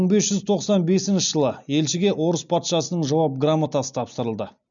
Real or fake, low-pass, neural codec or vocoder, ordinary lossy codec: real; 7.2 kHz; none; none